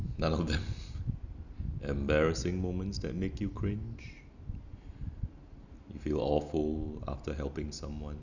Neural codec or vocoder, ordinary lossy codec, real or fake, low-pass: none; none; real; 7.2 kHz